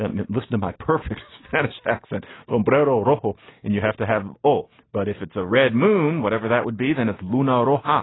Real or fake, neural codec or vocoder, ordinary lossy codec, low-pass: real; none; AAC, 16 kbps; 7.2 kHz